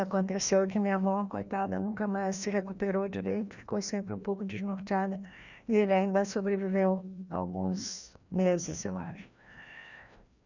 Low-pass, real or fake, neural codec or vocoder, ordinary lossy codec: 7.2 kHz; fake; codec, 16 kHz, 1 kbps, FreqCodec, larger model; none